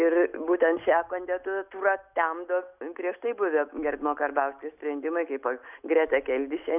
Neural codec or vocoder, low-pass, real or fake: none; 3.6 kHz; real